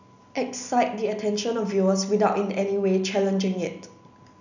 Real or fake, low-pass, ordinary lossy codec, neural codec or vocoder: real; 7.2 kHz; none; none